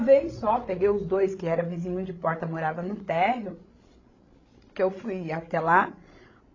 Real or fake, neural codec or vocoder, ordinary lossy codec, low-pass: fake; codec, 16 kHz, 16 kbps, FreqCodec, larger model; AAC, 32 kbps; 7.2 kHz